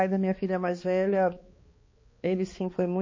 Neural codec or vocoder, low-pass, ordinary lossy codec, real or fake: codec, 16 kHz, 2 kbps, X-Codec, HuBERT features, trained on balanced general audio; 7.2 kHz; MP3, 32 kbps; fake